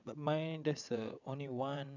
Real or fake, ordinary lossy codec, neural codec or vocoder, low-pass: fake; none; vocoder, 22.05 kHz, 80 mel bands, WaveNeXt; 7.2 kHz